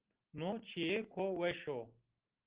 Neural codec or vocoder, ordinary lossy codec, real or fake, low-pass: none; Opus, 16 kbps; real; 3.6 kHz